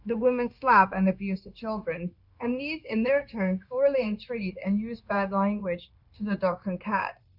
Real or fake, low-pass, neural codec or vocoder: fake; 5.4 kHz; codec, 16 kHz, 0.9 kbps, LongCat-Audio-Codec